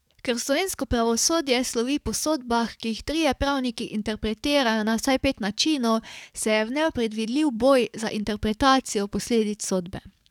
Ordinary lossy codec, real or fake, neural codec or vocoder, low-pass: none; fake; codec, 44.1 kHz, 7.8 kbps, DAC; 19.8 kHz